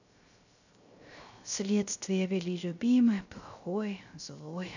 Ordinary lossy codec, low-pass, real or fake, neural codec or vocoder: none; 7.2 kHz; fake; codec, 16 kHz, 0.3 kbps, FocalCodec